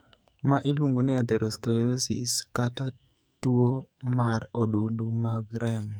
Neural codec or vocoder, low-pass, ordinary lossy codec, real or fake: codec, 44.1 kHz, 2.6 kbps, SNAC; none; none; fake